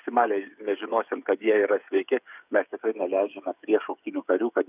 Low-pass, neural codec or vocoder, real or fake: 3.6 kHz; codec, 16 kHz, 8 kbps, FreqCodec, smaller model; fake